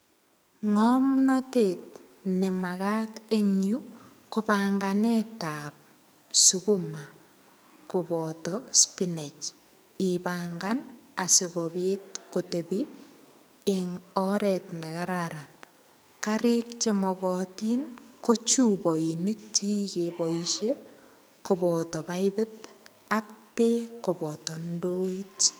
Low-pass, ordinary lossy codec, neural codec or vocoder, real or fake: none; none; codec, 44.1 kHz, 2.6 kbps, SNAC; fake